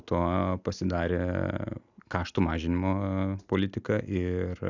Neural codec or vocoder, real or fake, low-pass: none; real; 7.2 kHz